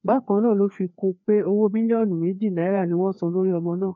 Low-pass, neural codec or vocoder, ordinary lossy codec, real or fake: 7.2 kHz; codec, 16 kHz, 2 kbps, FreqCodec, larger model; none; fake